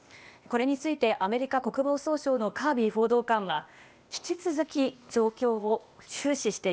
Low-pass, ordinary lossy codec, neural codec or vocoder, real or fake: none; none; codec, 16 kHz, 0.8 kbps, ZipCodec; fake